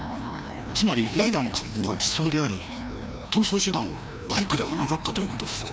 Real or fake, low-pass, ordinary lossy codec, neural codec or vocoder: fake; none; none; codec, 16 kHz, 1 kbps, FreqCodec, larger model